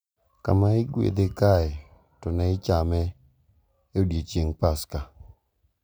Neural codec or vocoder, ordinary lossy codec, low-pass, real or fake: none; none; none; real